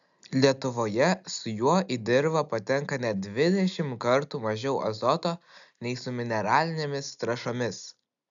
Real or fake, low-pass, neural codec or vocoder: real; 7.2 kHz; none